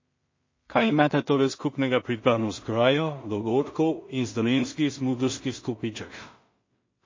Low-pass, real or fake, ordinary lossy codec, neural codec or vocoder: 7.2 kHz; fake; MP3, 32 kbps; codec, 16 kHz in and 24 kHz out, 0.4 kbps, LongCat-Audio-Codec, two codebook decoder